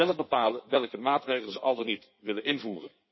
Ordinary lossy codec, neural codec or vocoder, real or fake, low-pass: MP3, 24 kbps; codec, 16 kHz in and 24 kHz out, 1.1 kbps, FireRedTTS-2 codec; fake; 7.2 kHz